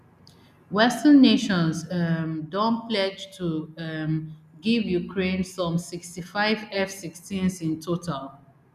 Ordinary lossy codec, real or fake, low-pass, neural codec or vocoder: none; real; 14.4 kHz; none